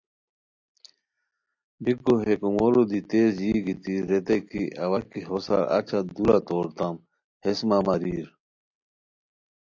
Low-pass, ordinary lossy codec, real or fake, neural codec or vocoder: 7.2 kHz; AAC, 48 kbps; real; none